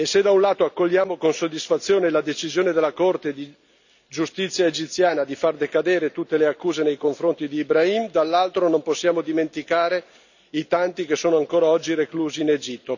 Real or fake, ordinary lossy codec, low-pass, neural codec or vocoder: real; none; 7.2 kHz; none